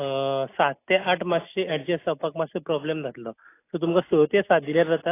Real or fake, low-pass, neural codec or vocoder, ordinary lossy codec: real; 3.6 kHz; none; AAC, 24 kbps